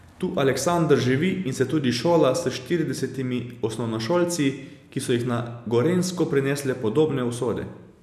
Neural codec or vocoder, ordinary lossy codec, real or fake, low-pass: none; none; real; 14.4 kHz